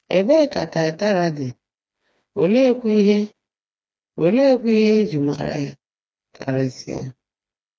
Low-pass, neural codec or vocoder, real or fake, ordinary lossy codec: none; codec, 16 kHz, 2 kbps, FreqCodec, smaller model; fake; none